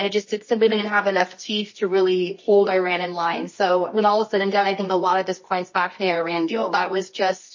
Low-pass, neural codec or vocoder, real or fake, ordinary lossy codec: 7.2 kHz; codec, 24 kHz, 0.9 kbps, WavTokenizer, medium music audio release; fake; MP3, 32 kbps